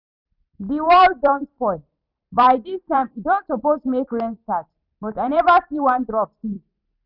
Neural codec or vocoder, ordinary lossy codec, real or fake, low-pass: vocoder, 44.1 kHz, 128 mel bands every 256 samples, BigVGAN v2; none; fake; 5.4 kHz